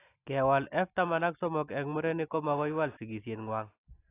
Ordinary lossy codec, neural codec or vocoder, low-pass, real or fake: AAC, 24 kbps; none; 3.6 kHz; real